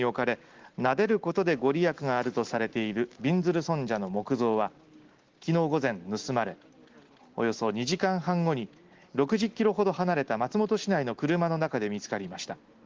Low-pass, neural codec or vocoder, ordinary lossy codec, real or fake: 7.2 kHz; none; Opus, 16 kbps; real